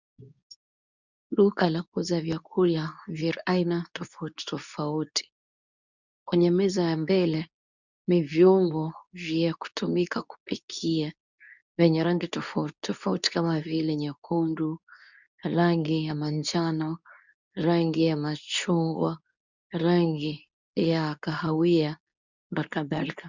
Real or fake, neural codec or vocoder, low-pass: fake; codec, 24 kHz, 0.9 kbps, WavTokenizer, medium speech release version 2; 7.2 kHz